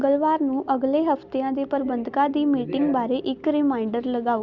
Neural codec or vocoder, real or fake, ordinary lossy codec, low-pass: none; real; Opus, 64 kbps; 7.2 kHz